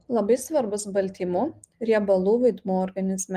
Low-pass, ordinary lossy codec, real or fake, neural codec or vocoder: 14.4 kHz; Opus, 24 kbps; real; none